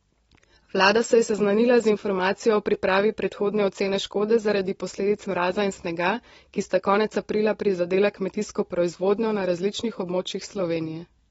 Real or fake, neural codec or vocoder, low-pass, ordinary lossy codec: real; none; 19.8 kHz; AAC, 24 kbps